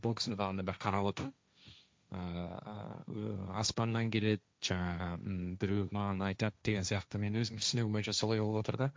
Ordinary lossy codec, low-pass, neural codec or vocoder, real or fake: none; none; codec, 16 kHz, 1.1 kbps, Voila-Tokenizer; fake